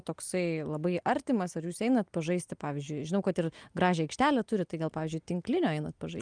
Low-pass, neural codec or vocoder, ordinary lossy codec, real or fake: 9.9 kHz; none; Opus, 32 kbps; real